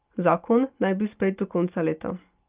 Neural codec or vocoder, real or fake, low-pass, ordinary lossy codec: none; real; 3.6 kHz; Opus, 24 kbps